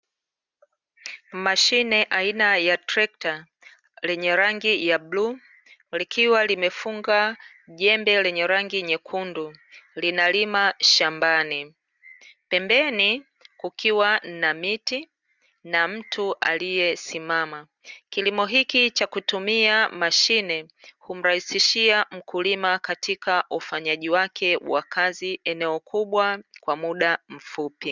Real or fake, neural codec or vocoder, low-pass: real; none; 7.2 kHz